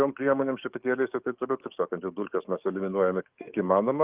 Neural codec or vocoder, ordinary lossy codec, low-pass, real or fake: codec, 44.1 kHz, 7.8 kbps, DAC; Opus, 32 kbps; 3.6 kHz; fake